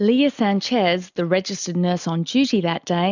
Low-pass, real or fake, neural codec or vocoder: 7.2 kHz; real; none